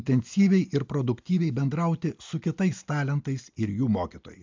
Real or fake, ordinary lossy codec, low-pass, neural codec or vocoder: real; MP3, 64 kbps; 7.2 kHz; none